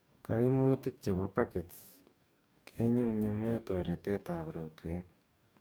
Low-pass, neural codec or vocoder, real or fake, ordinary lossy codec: none; codec, 44.1 kHz, 2.6 kbps, DAC; fake; none